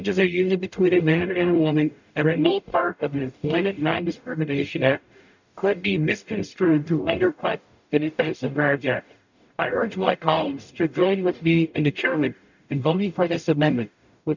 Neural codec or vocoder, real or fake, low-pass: codec, 44.1 kHz, 0.9 kbps, DAC; fake; 7.2 kHz